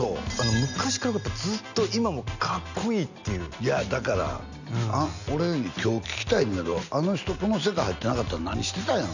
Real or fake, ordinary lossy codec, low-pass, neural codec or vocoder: real; none; 7.2 kHz; none